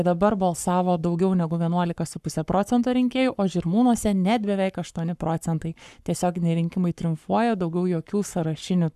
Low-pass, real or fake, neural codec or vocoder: 14.4 kHz; fake; codec, 44.1 kHz, 7.8 kbps, Pupu-Codec